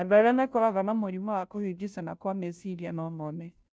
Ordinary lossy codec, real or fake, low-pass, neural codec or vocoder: none; fake; none; codec, 16 kHz, 0.5 kbps, FunCodec, trained on Chinese and English, 25 frames a second